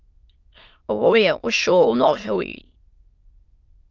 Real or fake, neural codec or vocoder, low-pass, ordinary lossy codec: fake; autoencoder, 22.05 kHz, a latent of 192 numbers a frame, VITS, trained on many speakers; 7.2 kHz; Opus, 24 kbps